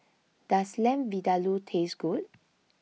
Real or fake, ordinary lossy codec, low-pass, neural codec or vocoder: real; none; none; none